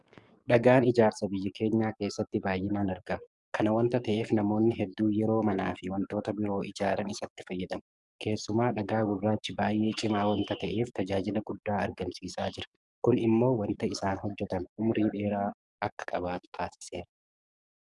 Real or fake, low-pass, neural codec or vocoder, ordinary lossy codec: fake; 10.8 kHz; codec, 44.1 kHz, 7.8 kbps, DAC; Opus, 64 kbps